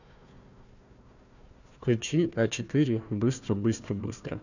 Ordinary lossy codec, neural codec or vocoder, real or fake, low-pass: none; codec, 16 kHz, 1 kbps, FunCodec, trained on Chinese and English, 50 frames a second; fake; 7.2 kHz